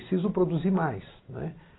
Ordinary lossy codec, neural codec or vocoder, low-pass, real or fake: AAC, 16 kbps; vocoder, 44.1 kHz, 128 mel bands every 256 samples, BigVGAN v2; 7.2 kHz; fake